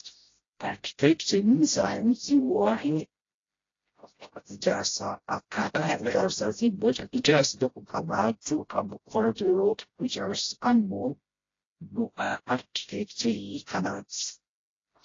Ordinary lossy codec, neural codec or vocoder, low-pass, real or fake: AAC, 32 kbps; codec, 16 kHz, 0.5 kbps, FreqCodec, smaller model; 7.2 kHz; fake